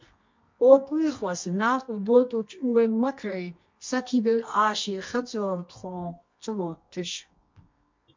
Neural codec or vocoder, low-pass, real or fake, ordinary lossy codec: codec, 24 kHz, 0.9 kbps, WavTokenizer, medium music audio release; 7.2 kHz; fake; MP3, 48 kbps